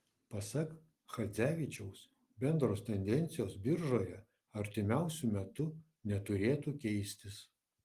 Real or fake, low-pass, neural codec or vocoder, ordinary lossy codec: real; 14.4 kHz; none; Opus, 24 kbps